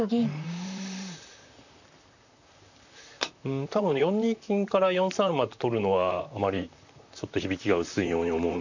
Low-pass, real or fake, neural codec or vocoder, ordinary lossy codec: 7.2 kHz; fake; vocoder, 44.1 kHz, 128 mel bands, Pupu-Vocoder; none